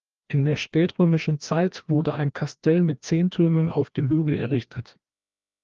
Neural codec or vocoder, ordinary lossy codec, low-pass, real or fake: codec, 16 kHz, 1 kbps, FreqCodec, larger model; Opus, 32 kbps; 7.2 kHz; fake